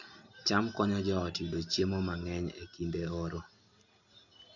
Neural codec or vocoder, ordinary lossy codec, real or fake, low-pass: none; none; real; 7.2 kHz